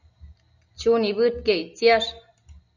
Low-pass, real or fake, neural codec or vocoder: 7.2 kHz; real; none